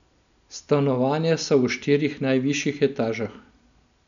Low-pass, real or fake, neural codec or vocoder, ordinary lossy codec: 7.2 kHz; real; none; none